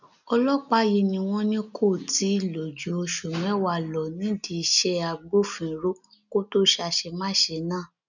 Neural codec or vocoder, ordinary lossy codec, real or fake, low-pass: none; none; real; 7.2 kHz